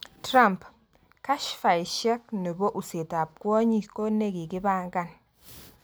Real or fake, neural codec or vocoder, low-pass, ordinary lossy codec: real; none; none; none